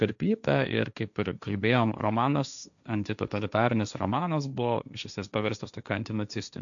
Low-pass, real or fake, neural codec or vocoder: 7.2 kHz; fake; codec, 16 kHz, 1.1 kbps, Voila-Tokenizer